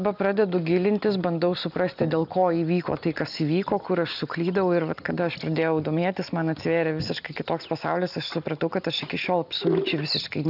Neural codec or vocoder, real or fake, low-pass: none; real; 5.4 kHz